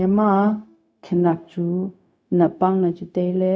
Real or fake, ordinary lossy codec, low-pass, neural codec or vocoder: fake; none; none; codec, 16 kHz, 0.4 kbps, LongCat-Audio-Codec